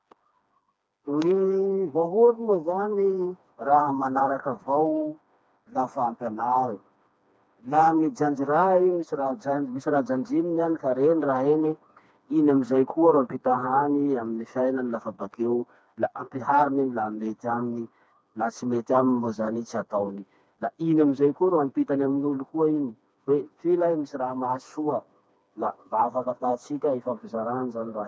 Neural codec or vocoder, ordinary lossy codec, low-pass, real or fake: codec, 16 kHz, 2 kbps, FreqCodec, smaller model; none; none; fake